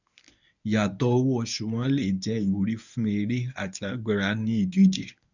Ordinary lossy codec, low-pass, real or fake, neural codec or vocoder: none; 7.2 kHz; fake; codec, 24 kHz, 0.9 kbps, WavTokenizer, medium speech release version 1